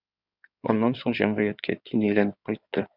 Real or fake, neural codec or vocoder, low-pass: fake; codec, 16 kHz in and 24 kHz out, 2.2 kbps, FireRedTTS-2 codec; 5.4 kHz